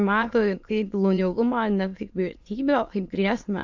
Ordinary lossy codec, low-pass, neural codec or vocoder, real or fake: AAC, 48 kbps; 7.2 kHz; autoencoder, 22.05 kHz, a latent of 192 numbers a frame, VITS, trained on many speakers; fake